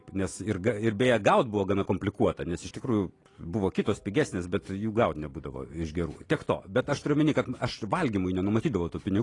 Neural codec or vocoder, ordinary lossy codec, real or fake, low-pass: none; AAC, 32 kbps; real; 10.8 kHz